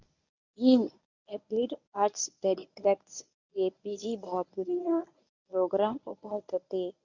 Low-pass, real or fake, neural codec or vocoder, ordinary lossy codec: 7.2 kHz; fake; codec, 24 kHz, 0.9 kbps, WavTokenizer, medium speech release version 1; none